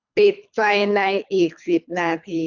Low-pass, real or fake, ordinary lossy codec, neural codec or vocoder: 7.2 kHz; fake; none; codec, 24 kHz, 3 kbps, HILCodec